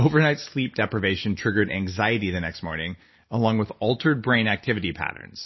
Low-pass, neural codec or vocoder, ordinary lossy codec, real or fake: 7.2 kHz; none; MP3, 24 kbps; real